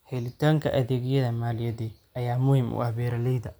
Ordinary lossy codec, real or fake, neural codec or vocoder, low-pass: none; real; none; none